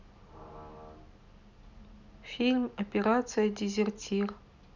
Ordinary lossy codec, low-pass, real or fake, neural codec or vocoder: none; 7.2 kHz; real; none